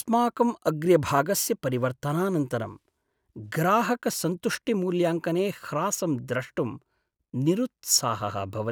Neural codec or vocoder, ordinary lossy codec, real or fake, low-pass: none; none; real; none